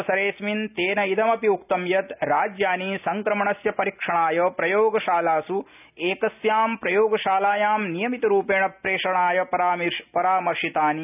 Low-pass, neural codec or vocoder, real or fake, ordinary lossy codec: 3.6 kHz; none; real; none